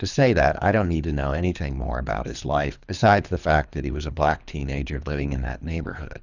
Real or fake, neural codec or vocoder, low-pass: fake; codec, 24 kHz, 3 kbps, HILCodec; 7.2 kHz